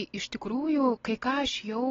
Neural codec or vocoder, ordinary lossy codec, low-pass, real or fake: none; AAC, 24 kbps; 7.2 kHz; real